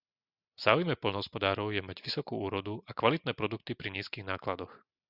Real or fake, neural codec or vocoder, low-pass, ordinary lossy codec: real; none; 5.4 kHz; Opus, 64 kbps